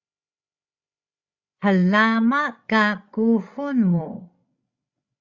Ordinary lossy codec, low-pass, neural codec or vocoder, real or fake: Opus, 64 kbps; 7.2 kHz; codec, 16 kHz, 16 kbps, FreqCodec, larger model; fake